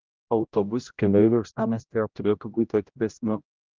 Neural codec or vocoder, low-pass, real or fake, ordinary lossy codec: codec, 16 kHz, 0.5 kbps, X-Codec, HuBERT features, trained on general audio; 7.2 kHz; fake; Opus, 24 kbps